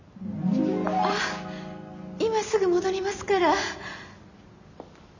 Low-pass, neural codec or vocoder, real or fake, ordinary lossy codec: 7.2 kHz; none; real; none